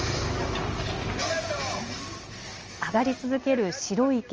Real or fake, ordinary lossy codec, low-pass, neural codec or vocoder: real; Opus, 24 kbps; 7.2 kHz; none